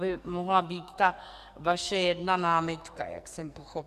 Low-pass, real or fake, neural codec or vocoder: 14.4 kHz; fake; codec, 44.1 kHz, 2.6 kbps, SNAC